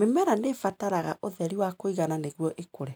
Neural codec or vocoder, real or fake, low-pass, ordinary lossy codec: none; real; none; none